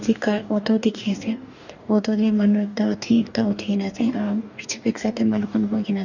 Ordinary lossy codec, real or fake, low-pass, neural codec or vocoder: none; fake; 7.2 kHz; codec, 44.1 kHz, 2.6 kbps, DAC